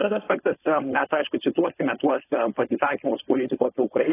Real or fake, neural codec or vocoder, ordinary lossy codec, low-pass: fake; codec, 16 kHz, 4.8 kbps, FACodec; AAC, 16 kbps; 3.6 kHz